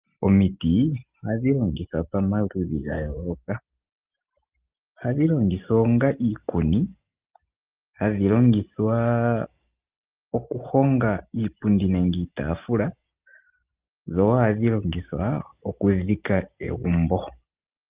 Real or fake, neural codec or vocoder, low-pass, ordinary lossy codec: real; none; 3.6 kHz; Opus, 32 kbps